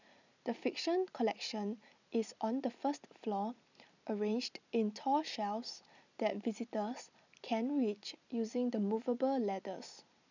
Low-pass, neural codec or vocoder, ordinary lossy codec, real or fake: 7.2 kHz; none; none; real